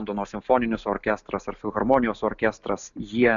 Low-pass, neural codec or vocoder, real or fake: 7.2 kHz; none; real